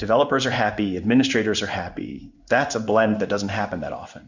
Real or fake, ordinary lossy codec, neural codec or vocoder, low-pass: fake; Opus, 64 kbps; codec, 16 kHz in and 24 kHz out, 1 kbps, XY-Tokenizer; 7.2 kHz